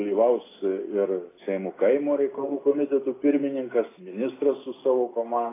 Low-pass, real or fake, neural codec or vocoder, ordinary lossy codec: 3.6 kHz; real; none; AAC, 16 kbps